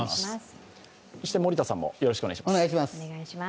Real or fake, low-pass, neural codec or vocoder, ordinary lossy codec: real; none; none; none